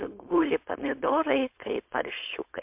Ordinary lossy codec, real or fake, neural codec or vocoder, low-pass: MP3, 32 kbps; fake; codec, 16 kHz, 4.8 kbps, FACodec; 3.6 kHz